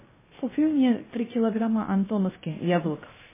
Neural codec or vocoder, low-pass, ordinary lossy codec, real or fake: codec, 16 kHz, 0.3 kbps, FocalCodec; 3.6 kHz; MP3, 16 kbps; fake